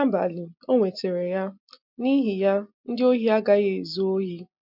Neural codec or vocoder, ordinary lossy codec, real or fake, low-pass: none; MP3, 48 kbps; real; 5.4 kHz